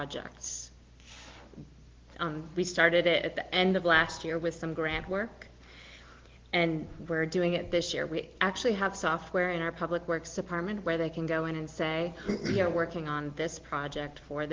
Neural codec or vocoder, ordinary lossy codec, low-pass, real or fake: none; Opus, 16 kbps; 7.2 kHz; real